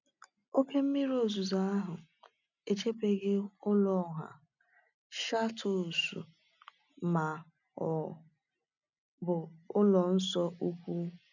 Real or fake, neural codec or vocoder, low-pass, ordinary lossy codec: real; none; 7.2 kHz; none